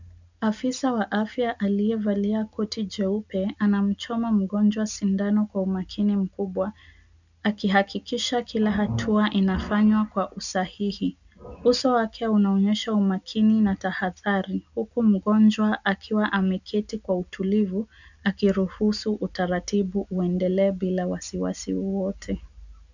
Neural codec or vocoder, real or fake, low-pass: none; real; 7.2 kHz